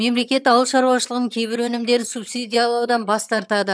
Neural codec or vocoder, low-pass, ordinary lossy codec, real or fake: vocoder, 22.05 kHz, 80 mel bands, HiFi-GAN; none; none; fake